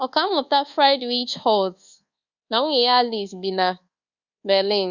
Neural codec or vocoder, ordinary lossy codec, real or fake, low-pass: codec, 24 kHz, 1.2 kbps, DualCodec; Opus, 64 kbps; fake; 7.2 kHz